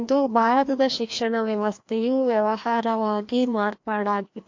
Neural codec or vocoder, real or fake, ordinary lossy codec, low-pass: codec, 16 kHz, 1 kbps, FreqCodec, larger model; fake; MP3, 48 kbps; 7.2 kHz